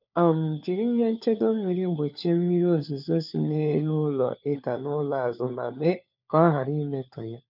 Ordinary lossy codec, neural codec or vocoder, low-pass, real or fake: none; codec, 16 kHz, 4 kbps, FunCodec, trained on LibriTTS, 50 frames a second; 5.4 kHz; fake